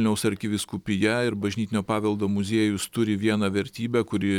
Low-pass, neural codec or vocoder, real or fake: 19.8 kHz; none; real